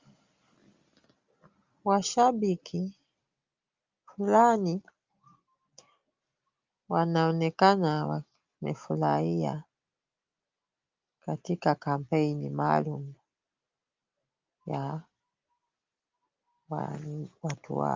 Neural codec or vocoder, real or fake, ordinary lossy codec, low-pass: none; real; Opus, 32 kbps; 7.2 kHz